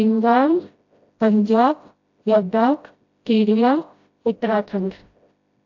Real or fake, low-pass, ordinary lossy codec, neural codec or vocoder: fake; 7.2 kHz; AAC, 48 kbps; codec, 16 kHz, 0.5 kbps, FreqCodec, smaller model